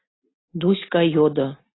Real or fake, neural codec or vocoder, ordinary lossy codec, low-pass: real; none; AAC, 16 kbps; 7.2 kHz